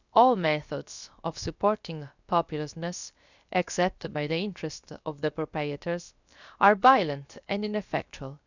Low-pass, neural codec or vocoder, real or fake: 7.2 kHz; codec, 16 kHz, about 1 kbps, DyCAST, with the encoder's durations; fake